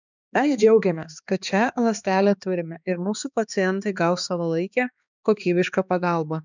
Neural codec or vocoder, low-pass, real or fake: codec, 16 kHz, 2 kbps, X-Codec, HuBERT features, trained on balanced general audio; 7.2 kHz; fake